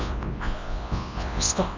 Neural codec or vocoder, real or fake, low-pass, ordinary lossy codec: codec, 24 kHz, 0.9 kbps, WavTokenizer, large speech release; fake; 7.2 kHz; MP3, 64 kbps